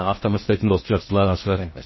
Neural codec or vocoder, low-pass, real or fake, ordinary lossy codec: codec, 16 kHz in and 24 kHz out, 0.6 kbps, FocalCodec, streaming, 4096 codes; 7.2 kHz; fake; MP3, 24 kbps